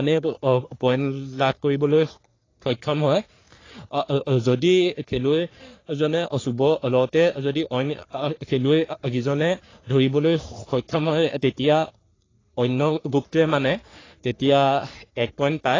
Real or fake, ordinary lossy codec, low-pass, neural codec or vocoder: fake; AAC, 32 kbps; 7.2 kHz; codec, 44.1 kHz, 3.4 kbps, Pupu-Codec